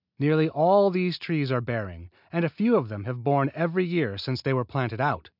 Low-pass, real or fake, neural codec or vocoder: 5.4 kHz; real; none